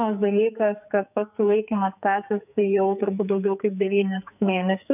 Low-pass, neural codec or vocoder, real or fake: 3.6 kHz; codec, 44.1 kHz, 2.6 kbps, SNAC; fake